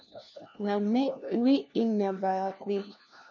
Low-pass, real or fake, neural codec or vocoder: 7.2 kHz; fake; codec, 16 kHz, 1 kbps, FunCodec, trained on LibriTTS, 50 frames a second